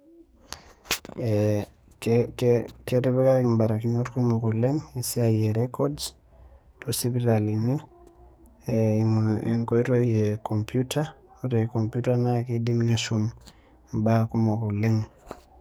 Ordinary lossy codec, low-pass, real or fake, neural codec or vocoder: none; none; fake; codec, 44.1 kHz, 2.6 kbps, SNAC